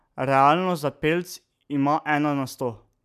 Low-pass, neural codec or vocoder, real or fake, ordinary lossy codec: 14.4 kHz; none; real; none